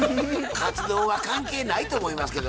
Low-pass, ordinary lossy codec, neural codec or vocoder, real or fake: none; none; none; real